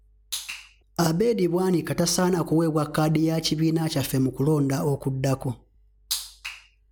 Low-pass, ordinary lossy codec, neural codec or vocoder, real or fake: none; none; none; real